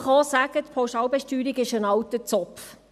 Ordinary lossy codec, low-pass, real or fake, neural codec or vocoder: none; 14.4 kHz; real; none